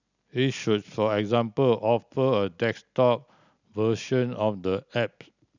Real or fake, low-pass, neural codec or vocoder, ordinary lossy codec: real; 7.2 kHz; none; none